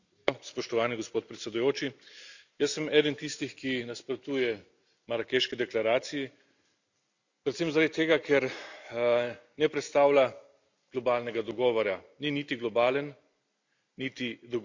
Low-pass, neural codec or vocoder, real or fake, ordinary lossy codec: 7.2 kHz; none; real; none